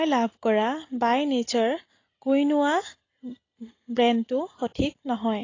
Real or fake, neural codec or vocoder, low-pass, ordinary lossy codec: real; none; 7.2 kHz; AAC, 32 kbps